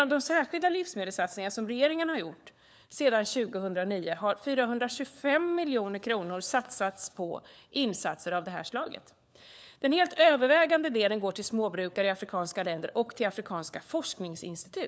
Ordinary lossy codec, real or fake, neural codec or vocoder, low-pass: none; fake; codec, 16 kHz, 4 kbps, FunCodec, trained on LibriTTS, 50 frames a second; none